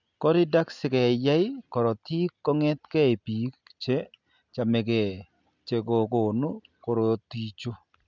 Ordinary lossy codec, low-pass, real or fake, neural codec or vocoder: none; 7.2 kHz; real; none